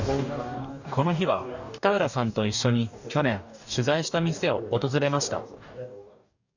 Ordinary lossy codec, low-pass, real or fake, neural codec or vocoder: none; 7.2 kHz; fake; codec, 44.1 kHz, 2.6 kbps, DAC